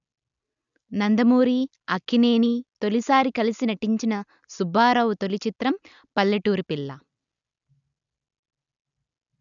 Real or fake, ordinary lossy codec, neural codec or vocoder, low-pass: real; none; none; 7.2 kHz